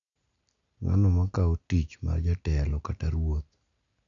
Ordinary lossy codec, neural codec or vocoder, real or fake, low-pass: none; none; real; 7.2 kHz